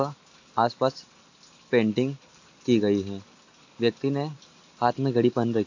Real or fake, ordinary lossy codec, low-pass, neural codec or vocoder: real; AAC, 48 kbps; 7.2 kHz; none